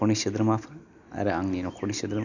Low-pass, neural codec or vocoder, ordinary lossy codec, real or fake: 7.2 kHz; none; none; real